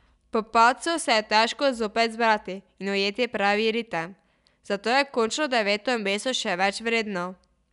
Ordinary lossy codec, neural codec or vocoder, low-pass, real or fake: none; none; 10.8 kHz; real